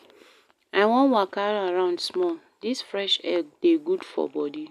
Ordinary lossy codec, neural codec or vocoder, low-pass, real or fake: none; none; 14.4 kHz; real